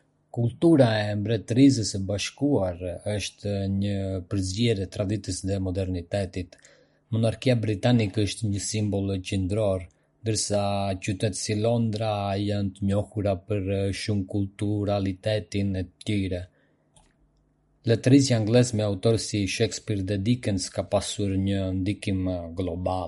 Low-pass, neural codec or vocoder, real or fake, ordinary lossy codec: 14.4 kHz; none; real; MP3, 48 kbps